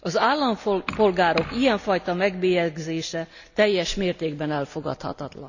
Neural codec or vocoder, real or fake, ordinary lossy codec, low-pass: none; real; none; 7.2 kHz